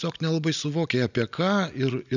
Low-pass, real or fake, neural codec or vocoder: 7.2 kHz; real; none